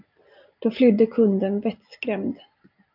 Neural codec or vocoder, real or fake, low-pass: none; real; 5.4 kHz